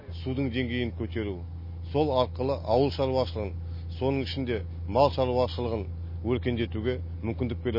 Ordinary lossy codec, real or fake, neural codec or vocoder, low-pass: MP3, 24 kbps; real; none; 5.4 kHz